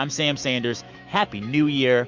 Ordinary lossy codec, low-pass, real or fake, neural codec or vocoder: MP3, 64 kbps; 7.2 kHz; fake; autoencoder, 48 kHz, 128 numbers a frame, DAC-VAE, trained on Japanese speech